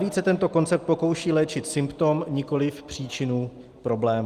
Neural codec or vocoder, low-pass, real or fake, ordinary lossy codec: none; 14.4 kHz; real; Opus, 32 kbps